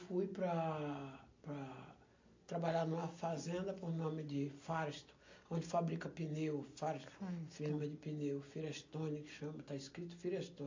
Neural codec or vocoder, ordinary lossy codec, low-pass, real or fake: none; AAC, 48 kbps; 7.2 kHz; real